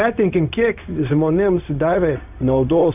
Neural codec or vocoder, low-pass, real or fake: codec, 16 kHz, 0.4 kbps, LongCat-Audio-Codec; 3.6 kHz; fake